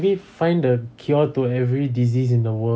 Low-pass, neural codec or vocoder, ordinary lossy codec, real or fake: none; none; none; real